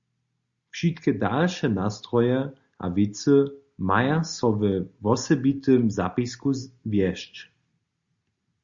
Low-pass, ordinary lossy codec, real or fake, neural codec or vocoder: 7.2 kHz; Opus, 64 kbps; real; none